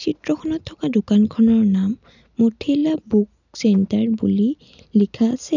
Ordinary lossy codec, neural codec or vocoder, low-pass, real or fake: none; none; 7.2 kHz; real